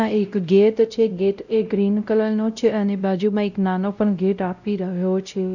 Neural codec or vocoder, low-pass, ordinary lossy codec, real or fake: codec, 16 kHz, 0.5 kbps, X-Codec, WavLM features, trained on Multilingual LibriSpeech; 7.2 kHz; none; fake